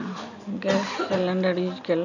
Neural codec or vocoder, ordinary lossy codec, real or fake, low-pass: none; none; real; 7.2 kHz